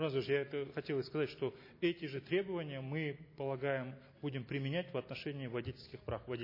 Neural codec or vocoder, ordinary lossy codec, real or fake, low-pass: none; MP3, 24 kbps; real; 5.4 kHz